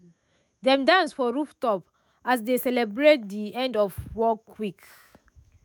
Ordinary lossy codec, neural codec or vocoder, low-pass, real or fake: none; autoencoder, 48 kHz, 128 numbers a frame, DAC-VAE, trained on Japanese speech; none; fake